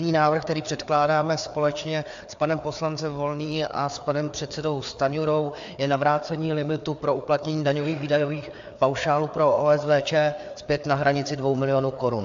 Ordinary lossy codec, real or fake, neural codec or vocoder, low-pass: AAC, 64 kbps; fake; codec, 16 kHz, 4 kbps, FreqCodec, larger model; 7.2 kHz